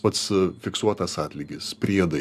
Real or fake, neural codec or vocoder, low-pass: real; none; 14.4 kHz